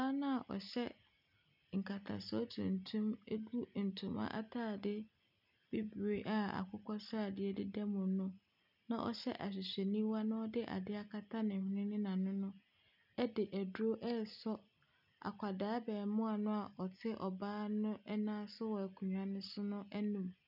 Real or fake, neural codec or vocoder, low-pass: real; none; 5.4 kHz